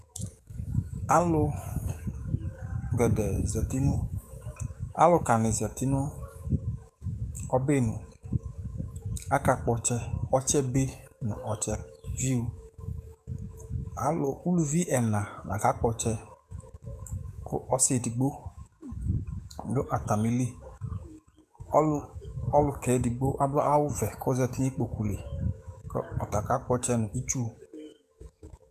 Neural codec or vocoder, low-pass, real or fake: codec, 44.1 kHz, 7.8 kbps, DAC; 14.4 kHz; fake